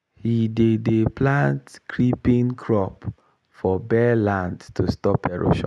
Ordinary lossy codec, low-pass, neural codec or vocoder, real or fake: none; none; none; real